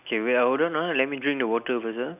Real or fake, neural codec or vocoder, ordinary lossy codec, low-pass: real; none; none; 3.6 kHz